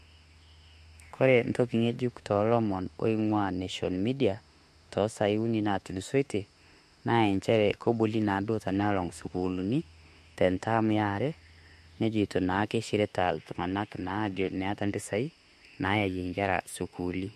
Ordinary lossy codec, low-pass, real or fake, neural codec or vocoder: MP3, 64 kbps; 14.4 kHz; fake; autoencoder, 48 kHz, 32 numbers a frame, DAC-VAE, trained on Japanese speech